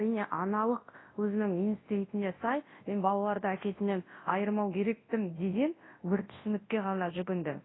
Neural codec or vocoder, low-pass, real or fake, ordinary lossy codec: codec, 24 kHz, 0.9 kbps, WavTokenizer, large speech release; 7.2 kHz; fake; AAC, 16 kbps